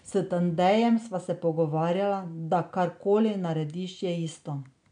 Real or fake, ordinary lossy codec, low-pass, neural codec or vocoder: real; none; 9.9 kHz; none